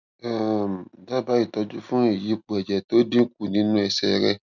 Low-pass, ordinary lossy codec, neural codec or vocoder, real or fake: 7.2 kHz; none; none; real